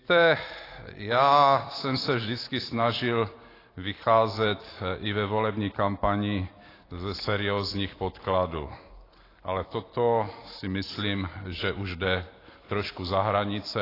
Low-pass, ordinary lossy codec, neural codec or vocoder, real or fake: 5.4 kHz; AAC, 24 kbps; none; real